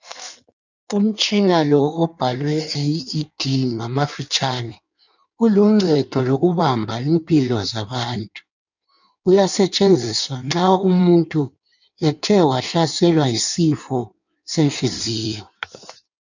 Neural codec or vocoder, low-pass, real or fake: codec, 16 kHz in and 24 kHz out, 1.1 kbps, FireRedTTS-2 codec; 7.2 kHz; fake